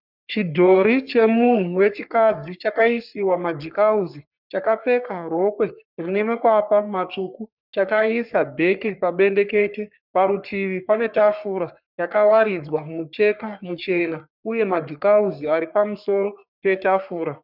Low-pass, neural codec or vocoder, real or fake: 5.4 kHz; codec, 44.1 kHz, 3.4 kbps, Pupu-Codec; fake